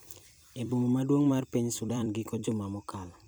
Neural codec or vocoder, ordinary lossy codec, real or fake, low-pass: vocoder, 44.1 kHz, 128 mel bands every 256 samples, BigVGAN v2; none; fake; none